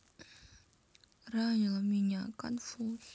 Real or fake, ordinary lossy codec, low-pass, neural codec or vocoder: real; none; none; none